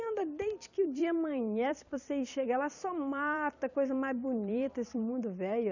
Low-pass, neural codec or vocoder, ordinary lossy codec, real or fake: 7.2 kHz; vocoder, 44.1 kHz, 128 mel bands every 256 samples, BigVGAN v2; none; fake